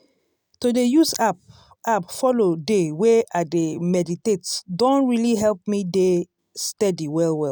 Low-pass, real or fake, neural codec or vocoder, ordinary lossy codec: none; real; none; none